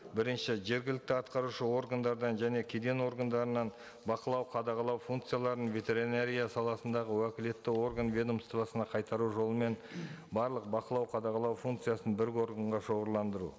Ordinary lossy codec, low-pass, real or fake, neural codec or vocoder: none; none; real; none